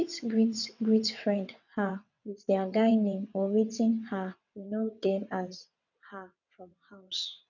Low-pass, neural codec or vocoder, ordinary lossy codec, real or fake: 7.2 kHz; vocoder, 22.05 kHz, 80 mel bands, WaveNeXt; none; fake